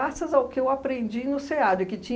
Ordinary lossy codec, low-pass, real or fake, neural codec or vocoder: none; none; real; none